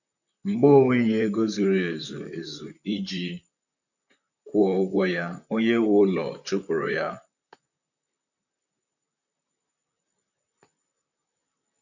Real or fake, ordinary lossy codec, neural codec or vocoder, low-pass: fake; none; vocoder, 44.1 kHz, 128 mel bands, Pupu-Vocoder; 7.2 kHz